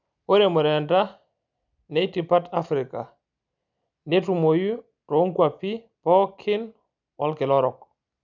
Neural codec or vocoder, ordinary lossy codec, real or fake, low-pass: none; none; real; 7.2 kHz